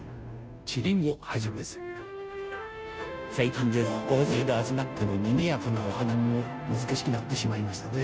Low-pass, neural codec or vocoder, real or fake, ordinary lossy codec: none; codec, 16 kHz, 0.5 kbps, FunCodec, trained on Chinese and English, 25 frames a second; fake; none